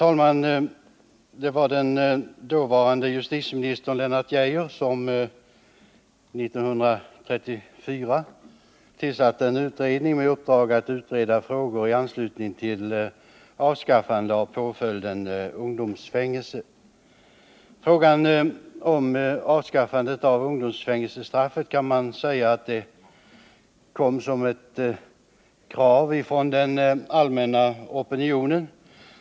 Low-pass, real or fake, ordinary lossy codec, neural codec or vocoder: none; real; none; none